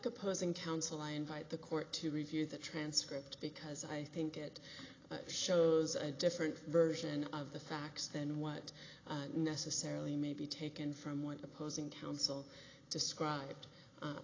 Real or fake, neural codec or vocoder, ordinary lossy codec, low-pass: real; none; AAC, 32 kbps; 7.2 kHz